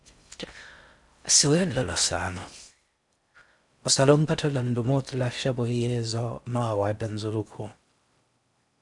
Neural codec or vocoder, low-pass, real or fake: codec, 16 kHz in and 24 kHz out, 0.6 kbps, FocalCodec, streaming, 4096 codes; 10.8 kHz; fake